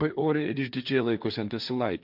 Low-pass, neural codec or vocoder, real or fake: 5.4 kHz; codec, 16 kHz, 1.1 kbps, Voila-Tokenizer; fake